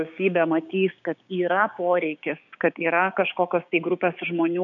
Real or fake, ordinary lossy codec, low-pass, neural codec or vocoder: fake; MP3, 96 kbps; 7.2 kHz; codec, 16 kHz, 6 kbps, DAC